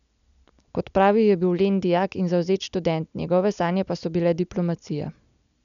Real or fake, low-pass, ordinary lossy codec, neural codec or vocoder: real; 7.2 kHz; none; none